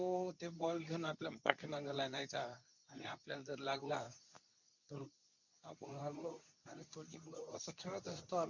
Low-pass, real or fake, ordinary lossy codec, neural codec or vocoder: 7.2 kHz; fake; none; codec, 24 kHz, 0.9 kbps, WavTokenizer, medium speech release version 2